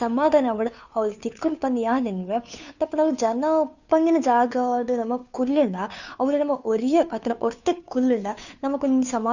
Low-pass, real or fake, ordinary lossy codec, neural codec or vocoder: 7.2 kHz; fake; none; codec, 16 kHz, 2 kbps, FunCodec, trained on Chinese and English, 25 frames a second